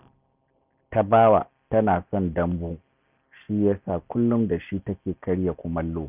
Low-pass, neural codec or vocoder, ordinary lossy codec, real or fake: 3.6 kHz; none; none; real